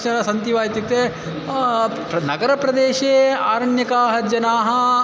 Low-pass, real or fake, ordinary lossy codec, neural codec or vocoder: none; real; none; none